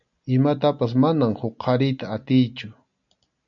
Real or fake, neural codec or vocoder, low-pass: real; none; 7.2 kHz